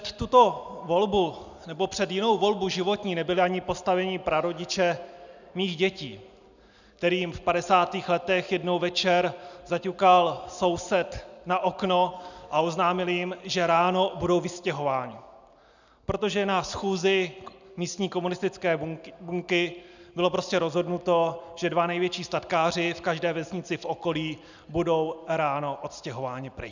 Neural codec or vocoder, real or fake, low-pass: none; real; 7.2 kHz